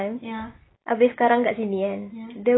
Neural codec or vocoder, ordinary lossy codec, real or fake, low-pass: none; AAC, 16 kbps; real; 7.2 kHz